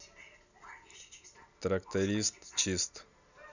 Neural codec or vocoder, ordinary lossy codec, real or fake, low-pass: none; none; real; 7.2 kHz